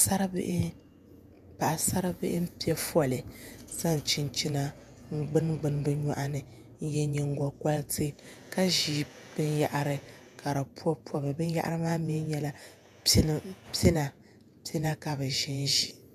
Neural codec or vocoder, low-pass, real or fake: vocoder, 48 kHz, 128 mel bands, Vocos; 14.4 kHz; fake